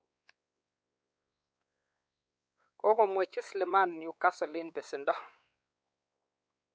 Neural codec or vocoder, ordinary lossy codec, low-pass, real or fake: codec, 16 kHz, 4 kbps, X-Codec, WavLM features, trained on Multilingual LibriSpeech; none; none; fake